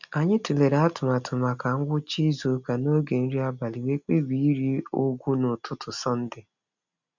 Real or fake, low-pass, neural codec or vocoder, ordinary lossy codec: real; 7.2 kHz; none; none